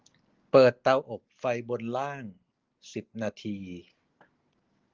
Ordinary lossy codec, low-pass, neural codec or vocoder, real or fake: Opus, 16 kbps; 7.2 kHz; none; real